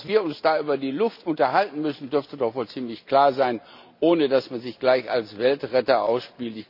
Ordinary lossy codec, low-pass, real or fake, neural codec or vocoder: none; 5.4 kHz; real; none